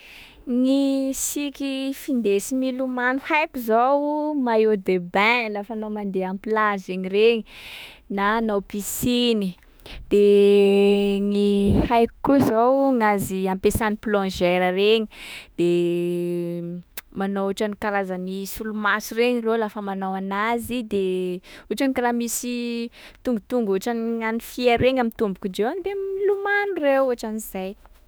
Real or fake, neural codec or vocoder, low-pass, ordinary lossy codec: fake; autoencoder, 48 kHz, 32 numbers a frame, DAC-VAE, trained on Japanese speech; none; none